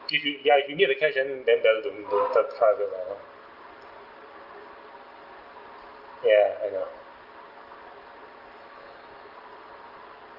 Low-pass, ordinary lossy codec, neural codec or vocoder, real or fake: 5.4 kHz; Opus, 32 kbps; none; real